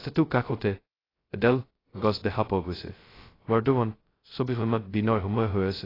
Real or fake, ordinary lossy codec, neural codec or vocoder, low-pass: fake; AAC, 24 kbps; codec, 16 kHz, 0.2 kbps, FocalCodec; 5.4 kHz